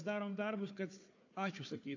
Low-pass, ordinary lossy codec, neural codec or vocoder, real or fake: 7.2 kHz; AAC, 48 kbps; codec, 16 kHz, 4 kbps, FunCodec, trained on Chinese and English, 50 frames a second; fake